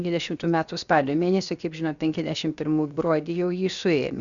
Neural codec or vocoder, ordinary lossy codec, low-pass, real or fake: codec, 16 kHz, 0.7 kbps, FocalCodec; Opus, 64 kbps; 7.2 kHz; fake